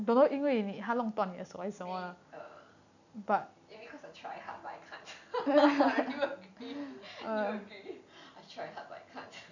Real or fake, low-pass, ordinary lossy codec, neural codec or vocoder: real; 7.2 kHz; none; none